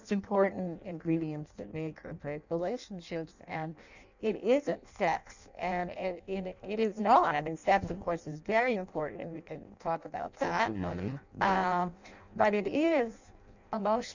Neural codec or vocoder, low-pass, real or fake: codec, 16 kHz in and 24 kHz out, 0.6 kbps, FireRedTTS-2 codec; 7.2 kHz; fake